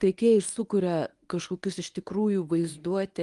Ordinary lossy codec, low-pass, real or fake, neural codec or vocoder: Opus, 32 kbps; 10.8 kHz; fake; codec, 24 kHz, 0.9 kbps, WavTokenizer, medium speech release version 1